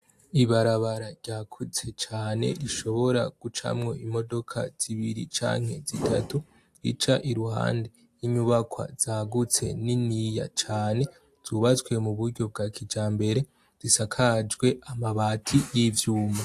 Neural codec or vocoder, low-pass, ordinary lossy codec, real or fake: none; 14.4 kHz; AAC, 64 kbps; real